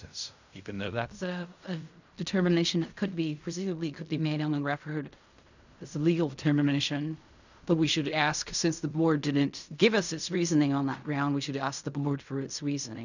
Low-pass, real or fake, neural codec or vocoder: 7.2 kHz; fake; codec, 16 kHz in and 24 kHz out, 0.4 kbps, LongCat-Audio-Codec, fine tuned four codebook decoder